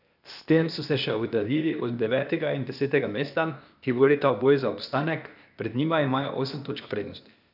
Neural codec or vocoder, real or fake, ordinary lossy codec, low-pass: codec, 16 kHz, 0.8 kbps, ZipCodec; fake; none; 5.4 kHz